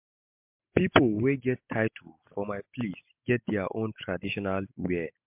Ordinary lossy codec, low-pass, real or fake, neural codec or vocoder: MP3, 32 kbps; 3.6 kHz; real; none